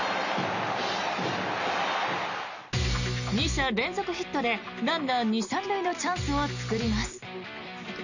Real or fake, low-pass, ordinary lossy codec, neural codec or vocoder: real; 7.2 kHz; none; none